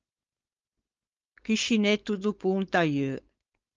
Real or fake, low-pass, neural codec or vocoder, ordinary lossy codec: fake; 7.2 kHz; codec, 16 kHz, 4.8 kbps, FACodec; Opus, 24 kbps